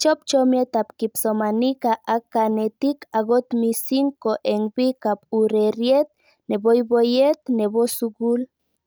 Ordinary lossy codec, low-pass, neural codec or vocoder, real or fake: none; none; none; real